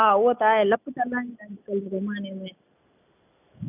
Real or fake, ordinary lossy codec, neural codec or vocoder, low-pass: real; none; none; 3.6 kHz